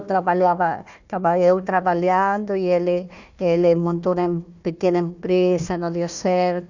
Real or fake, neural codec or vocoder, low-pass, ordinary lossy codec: fake; codec, 16 kHz, 1 kbps, FunCodec, trained on Chinese and English, 50 frames a second; 7.2 kHz; none